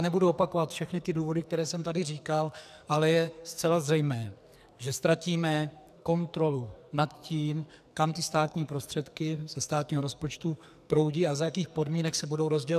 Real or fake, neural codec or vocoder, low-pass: fake; codec, 44.1 kHz, 2.6 kbps, SNAC; 14.4 kHz